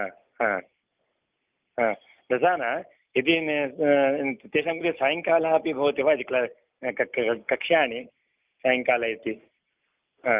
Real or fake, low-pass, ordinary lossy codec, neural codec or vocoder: real; 3.6 kHz; Opus, 24 kbps; none